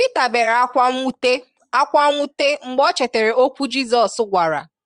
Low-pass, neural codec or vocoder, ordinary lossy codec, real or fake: 9.9 kHz; vocoder, 22.05 kHz, 80 mel bands, Vocos; Opus, 32 kbps; fake